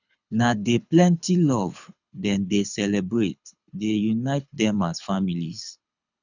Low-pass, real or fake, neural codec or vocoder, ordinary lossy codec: 7.2 kHz; fake; codec, 24 kHz, 6 kbps, HILCodec; none